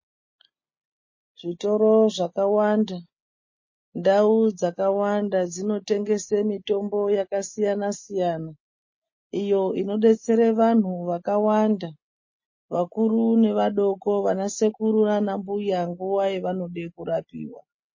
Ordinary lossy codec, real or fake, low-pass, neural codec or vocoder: MP3, 32 kbps; real; 7.2 kHz; none